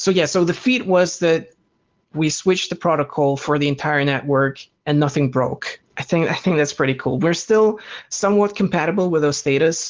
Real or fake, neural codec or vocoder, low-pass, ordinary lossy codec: real; none; 7.2 kHz; Opus, 16 kbps